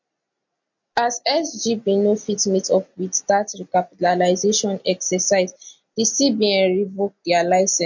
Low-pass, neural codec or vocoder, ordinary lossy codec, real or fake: 7.2 kHz; none; MP3, 48 kbps; real